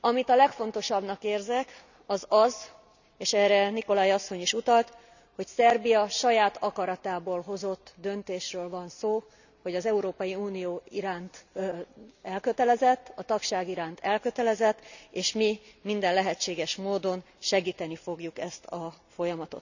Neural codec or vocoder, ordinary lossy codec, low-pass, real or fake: none; none; 7.2 kHz; real